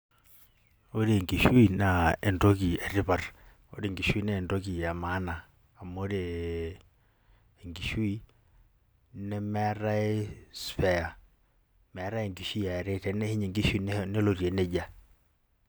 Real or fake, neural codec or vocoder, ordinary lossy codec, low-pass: real; none; none; none